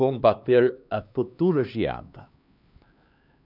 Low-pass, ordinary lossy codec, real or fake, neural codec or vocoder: 5.4 kHz; none; fake; codec, 16 kHz, 2 kbps, X-Codec, HuBERT features, trained on LibriSpeech